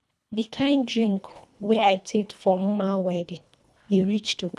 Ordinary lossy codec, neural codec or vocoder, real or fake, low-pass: none; codec, 24 kHz, 1.5 kbps, HILCodec; fake; none